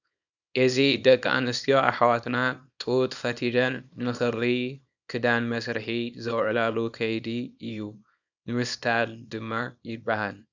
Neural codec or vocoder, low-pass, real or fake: codec, 24 kHz, 0.9 kbps, WavTokenizer, small release; 7.2 kHz; fake